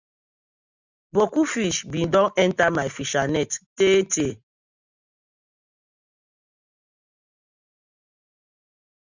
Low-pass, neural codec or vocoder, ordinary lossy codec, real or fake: 7.2 kHz; none; Opus, 64 kbps; real